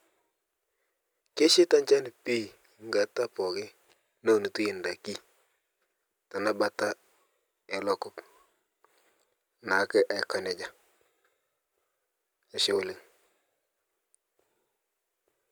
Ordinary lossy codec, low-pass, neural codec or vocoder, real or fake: none; none; none; real